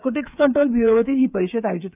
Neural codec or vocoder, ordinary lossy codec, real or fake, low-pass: codec, 16 kHz, 8 kbps, FreqCodec, smaller model; none; fake; 3.6 kHz